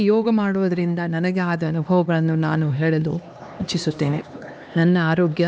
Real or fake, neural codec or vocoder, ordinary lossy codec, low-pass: fake; codec, 16 kHz, 2 kbps, X-Codec, HuBERT features, trained on LibriSpeech; none; none